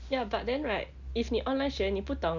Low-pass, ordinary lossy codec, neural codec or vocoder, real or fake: 7.2 kHz; none; none; real